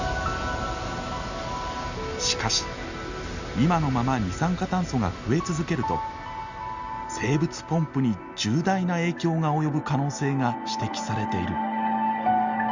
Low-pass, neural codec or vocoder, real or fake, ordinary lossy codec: 7.2 kHz; none; real; Opus, 64 kbps